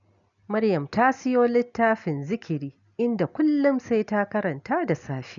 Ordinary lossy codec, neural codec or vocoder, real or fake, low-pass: none; none; real; 7.2 kHz